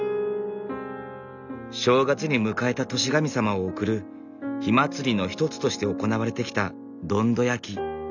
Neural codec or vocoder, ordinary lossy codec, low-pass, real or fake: none; none; 7.2 kHz; real